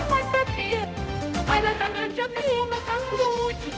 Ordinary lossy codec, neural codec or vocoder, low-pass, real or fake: none; codec, 16 kHz, 0.5 kbps, X-Codec, HuBERT features, trained on balanced general audio; none; fake